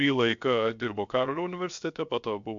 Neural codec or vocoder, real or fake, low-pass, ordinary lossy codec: codec, 16 kHz, 0.7 kbps, FocalCodec; fake; 7.2 kHz; MP3, 64 kbps